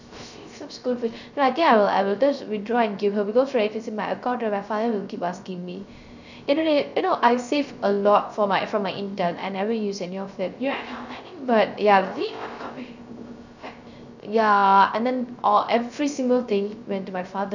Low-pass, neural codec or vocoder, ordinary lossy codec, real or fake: 7.2 kHz; codec, 16 kHz, 0.3 kbps, FocalCodec; none; fake